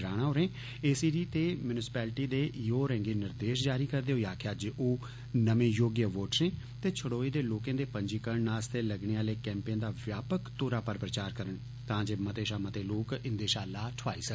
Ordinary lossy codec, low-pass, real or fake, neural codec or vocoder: none; none; real; none